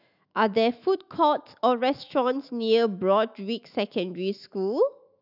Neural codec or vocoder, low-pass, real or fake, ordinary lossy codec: autoencoder, 48 kHz, 128 numbers a frame, DAC-VAE, trained on Japanese speech; 5.4 kHz; fake; none